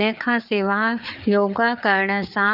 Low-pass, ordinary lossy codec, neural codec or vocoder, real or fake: 5.4 kHz; none; codec, 16 kHz, 4 kbps, FreqCodec, larger model; fake